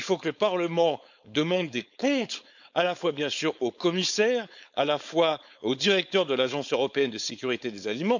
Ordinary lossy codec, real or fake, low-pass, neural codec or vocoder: none; fake; 7.2 kHz; codec, 16 kHz, 4.8 kbps, FACodec